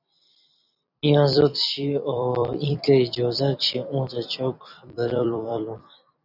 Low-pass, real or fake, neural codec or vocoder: 5.4 kHz; fake; vocoder, 44.1 kHz, 128 mel bands every 256 samples, BigVGAN v2